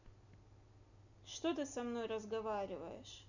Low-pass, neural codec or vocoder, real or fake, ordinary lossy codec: 7.2 kHz; none; real; none